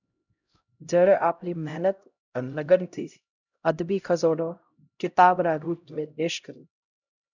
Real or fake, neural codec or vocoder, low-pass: fake; codec, 16 kHz, 0.5 kbps, X-Codec, HuBERT features, trained on LibriSpeech; 7.2 kHz